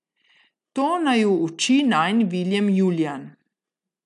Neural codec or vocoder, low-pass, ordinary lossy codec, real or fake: none; 10.8 kHz; MP3, 96 kbps; real